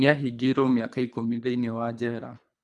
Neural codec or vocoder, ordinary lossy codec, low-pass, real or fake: codec, 24 kHz, 3 kbps, HILCodec; none; none; fake